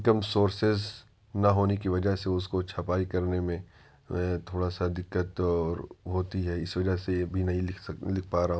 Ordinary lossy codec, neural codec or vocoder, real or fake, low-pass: none; none; real; none